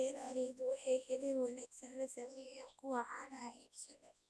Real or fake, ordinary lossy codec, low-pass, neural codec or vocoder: fake; none; none; codec, 24 kHz, 0.9 kbps, WavTokenizer, large speech release